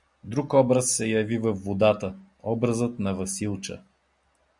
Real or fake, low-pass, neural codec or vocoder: real; 10.8 kHz; none